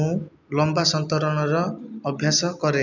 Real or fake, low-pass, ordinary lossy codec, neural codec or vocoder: real; 7.2 kHz; none; none